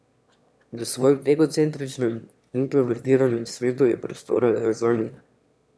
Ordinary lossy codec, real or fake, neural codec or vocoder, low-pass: none; fake; autoencoder, 22.05 kHz, a latent of 192 numbers a frame, VITS, trained on one speaker; none